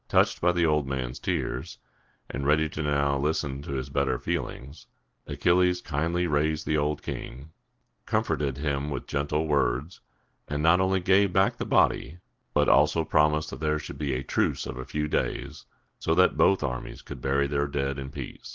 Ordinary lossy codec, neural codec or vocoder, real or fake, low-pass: Opus, 16 kbps; none; real; 7.2 kHz